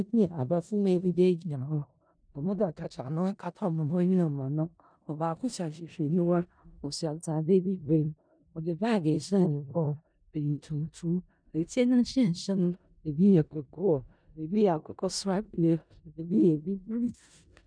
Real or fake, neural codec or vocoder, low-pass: fake; codec, 16 kHz in and 24 kHz out, 0.4 kbps, LongCat-Audio-Codec, four codebook decoder; 9.9 kHz